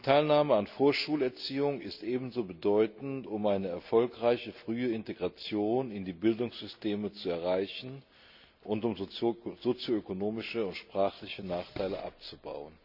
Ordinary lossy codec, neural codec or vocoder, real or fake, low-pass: none; none; real; 5.4 kHz